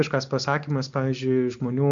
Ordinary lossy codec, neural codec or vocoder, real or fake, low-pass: MP3, 64 kbps; none; real; 7.2 kHz